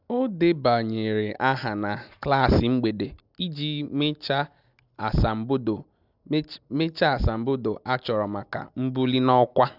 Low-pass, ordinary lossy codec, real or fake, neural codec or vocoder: 5.4 kHz; none; real; none